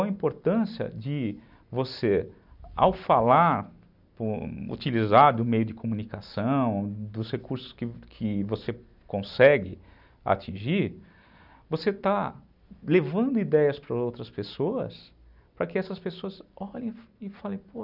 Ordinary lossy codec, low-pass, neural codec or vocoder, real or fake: none; 5.4 kHz; none; real